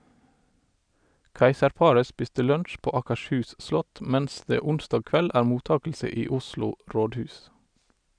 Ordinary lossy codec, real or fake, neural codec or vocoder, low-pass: none; real; none; 9.9 kHz